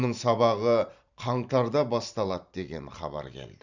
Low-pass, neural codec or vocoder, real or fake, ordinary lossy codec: 7.2 kHz; vocoder, 44.1 kHz, 128 mel bands every 512 samples, BigVGAN v2; fake; none